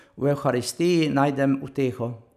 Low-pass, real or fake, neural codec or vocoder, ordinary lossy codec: 14.4 kHz; real; none; none